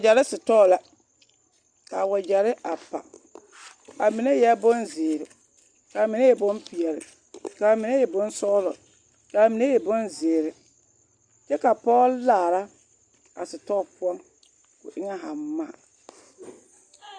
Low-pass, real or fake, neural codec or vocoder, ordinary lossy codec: 9.9 kHz; real; none; Opus, 64 kbps